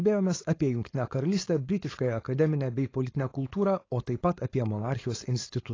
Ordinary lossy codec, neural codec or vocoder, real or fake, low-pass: AAC, 32 kbps; codec, 16 kHz, 4.8 kbps, FACodec; fake; 7.2 kHz